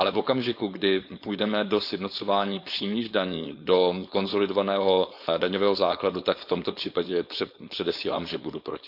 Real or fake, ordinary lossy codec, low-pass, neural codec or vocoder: fake; none; 5.4 kHz; codec, 16 kHz, 4.8 kbps, FACodec